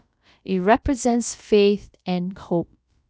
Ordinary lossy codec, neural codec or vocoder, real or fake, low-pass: none; codec, 16 kHz, about 1 kbps, DyCAST, with the encoder's durations; fake; none